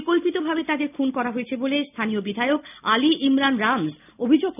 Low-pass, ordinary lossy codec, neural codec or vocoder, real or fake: 3.6 kHz; none; none; real